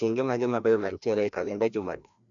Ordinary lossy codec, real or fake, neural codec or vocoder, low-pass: none; fake; codec, 16 kHz, 1 kbps, FreqCodec, larger model; 7.2 kHz